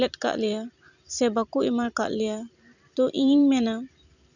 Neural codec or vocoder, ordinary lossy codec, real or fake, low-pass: vocoder, 44.1 kHz, 128 mel bands every 256 samples, BigVGAN v2; none; fake; 7.2 kHz